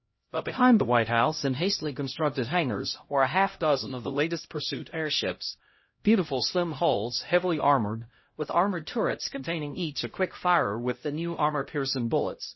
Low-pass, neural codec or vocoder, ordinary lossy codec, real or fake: 7.2 kHz; codec, 16 kHz, 0.5 kbps, X-Codec, HuBERT features, trained on LibriSpeech; MP3, 24 kbps; fake